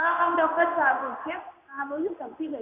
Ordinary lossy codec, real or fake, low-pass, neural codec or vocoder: none; fake; 3.6 kHz; codec, 16 kHz in and 24 kHz out, 1 kbps, XY-Tokenizer